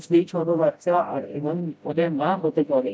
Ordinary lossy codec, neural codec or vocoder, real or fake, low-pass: none; codec, 16 kHz, 0.5 kbps, FreqCodec, smaller model; fake; none